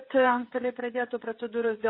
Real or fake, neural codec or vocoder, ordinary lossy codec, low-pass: fake; codec, 16 kHz, 16 kbps, FreqCodec, smaller model; MP3, 24 kbps; 5.4 kHz